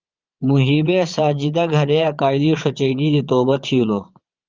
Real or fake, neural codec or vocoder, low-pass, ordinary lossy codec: fake; vocoder, 22.05 kHz, 80 mel bands, Vocos; 7.2 kHz; Opus, 32 kbps